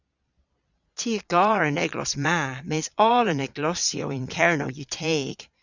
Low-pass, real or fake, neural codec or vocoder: 7.2 kHz; fake; vocoder, 22.05 kHz, 80 mel bands, Vocos